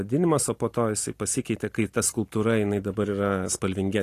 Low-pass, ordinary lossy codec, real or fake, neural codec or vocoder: 14.4 kHz; AAC, 48 kbps; real; none